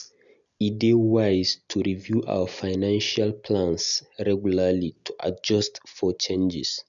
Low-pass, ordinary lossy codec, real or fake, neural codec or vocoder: 7.2 kHz; none; real; none